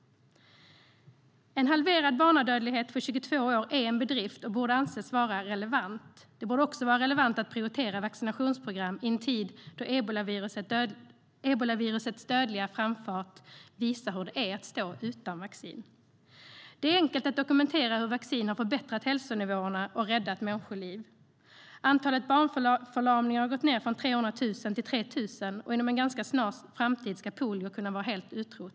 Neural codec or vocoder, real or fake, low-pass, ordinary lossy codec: none; real; none; none